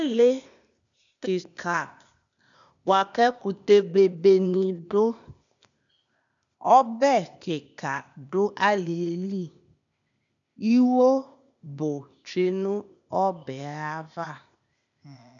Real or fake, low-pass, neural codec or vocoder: fake; 7.2 kHz; codec, 16 kHz, 0.8 kbps, ZipCodec